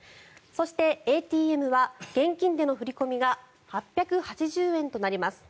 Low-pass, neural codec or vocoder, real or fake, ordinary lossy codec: none; none; real; none